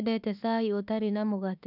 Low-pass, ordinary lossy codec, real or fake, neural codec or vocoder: 5.4 kHz; none; fake; autoencoder, 48 kHz, 32 numbers a frame, DAC-VAE, trained on Japanese speech